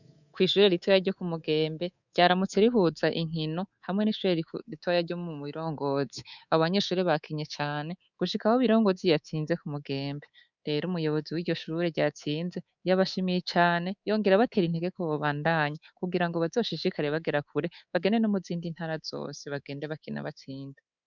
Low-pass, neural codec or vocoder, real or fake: 7.2 kHz; codec, 24 kHz, 3.1 kbps, DualCodec; fake